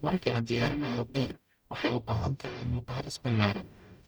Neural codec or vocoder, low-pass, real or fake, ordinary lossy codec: codec, 44.1 kHz, 0.9 kbps, DAC; none; fake; none